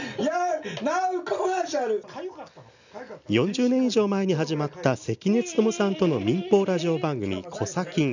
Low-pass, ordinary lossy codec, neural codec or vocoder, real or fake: 7.2 kHz; none; none; real